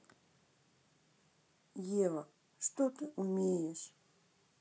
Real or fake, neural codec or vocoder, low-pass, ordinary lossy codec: real; none; none; none